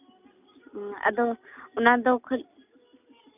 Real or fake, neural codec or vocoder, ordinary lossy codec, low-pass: real; none; none; 3.6 kHz